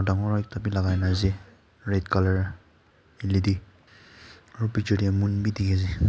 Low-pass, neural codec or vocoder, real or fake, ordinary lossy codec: none; none; real; none